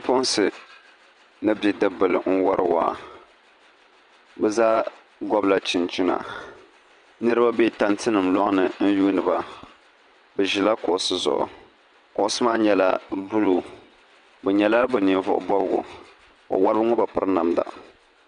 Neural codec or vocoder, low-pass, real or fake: vocoder, 22.05 kHz, 80 mel bands, WaveNeXt; 9.9 kHz; fake